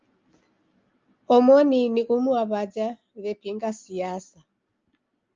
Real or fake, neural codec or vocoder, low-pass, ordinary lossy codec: real; none; 7.2 kHz; Opus, 24 kbps